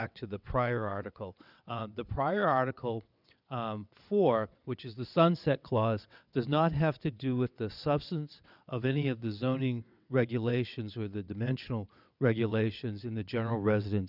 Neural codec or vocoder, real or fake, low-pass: vocoder, 22.05 kHz, 80 mel bands, WaveNeXt; fake; 5.4 kHz